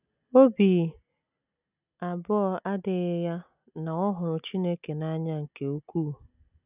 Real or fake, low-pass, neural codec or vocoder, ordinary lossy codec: real; 3.6 kHz; none; none